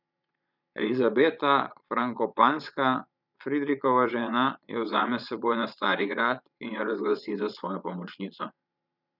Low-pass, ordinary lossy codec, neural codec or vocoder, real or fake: 5.4 kHz; none; vocoder, 22.05 kHz, 80 mel bands, Vocos; fake